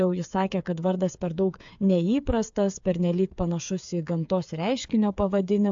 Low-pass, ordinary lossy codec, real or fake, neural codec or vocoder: 7.2 kHz; AAC, 64 kbps; fake; codec, 16 kHz, 8 kbps, FreqCodec, smaller model